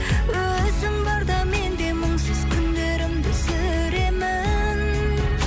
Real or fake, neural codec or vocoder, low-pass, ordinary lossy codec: real; none; none; none